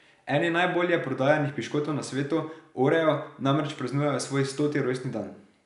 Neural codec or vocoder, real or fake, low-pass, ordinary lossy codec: none; real; 10.8 kHz; none